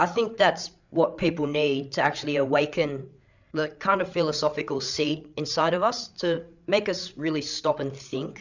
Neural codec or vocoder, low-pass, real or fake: codec, 16 kHz, 8 kbps, FreqCodec, larger model; 7.2 kHz; fake